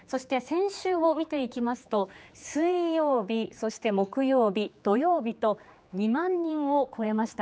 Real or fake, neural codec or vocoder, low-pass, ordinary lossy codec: fake; codec, 16 kHz, 4 kbps, X-Codec, HuBERT features, trained on general audio; none; none